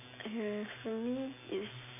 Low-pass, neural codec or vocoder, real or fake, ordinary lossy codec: 3.6 kHz; none; real; none